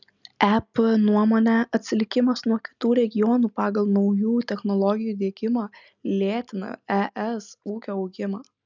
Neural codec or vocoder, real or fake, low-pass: none; real; 7.2 kHz